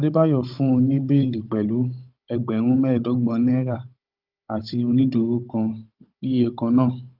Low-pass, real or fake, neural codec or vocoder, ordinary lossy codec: 5.4 kHz; fake; codec, 16 kHz, 16 kbps, FunCodec, trained on Chinese and English, 50 frames a second; Opus, 24 kbps